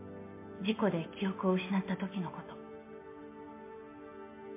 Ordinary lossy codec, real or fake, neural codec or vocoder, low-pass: MP3, 24 kbps; real; none; 3.6 kHz